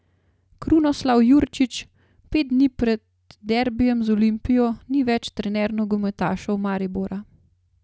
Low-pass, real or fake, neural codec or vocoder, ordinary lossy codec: none; real; none; none